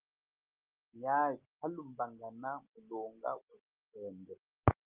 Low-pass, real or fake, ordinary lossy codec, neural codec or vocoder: 3.6 kHz; real; Opus, 32 kbps; none